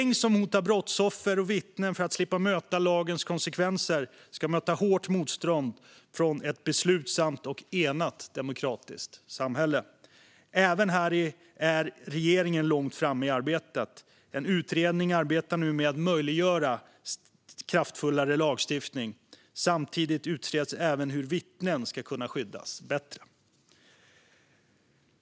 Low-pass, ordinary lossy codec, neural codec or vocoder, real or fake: none; none; none; real